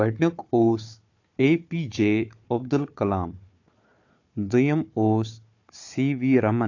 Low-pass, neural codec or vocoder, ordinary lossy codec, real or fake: 7.2 kHz; codec, 16 kHz, 4 kbps, FunCodec, trained on Chinese and English, 50 frames a second; AAC, 48 kbps; fake